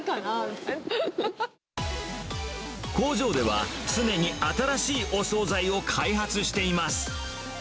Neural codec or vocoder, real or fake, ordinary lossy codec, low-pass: none; real; none; none